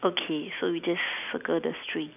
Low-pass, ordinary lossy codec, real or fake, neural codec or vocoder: 3.6 kHz; none; real; none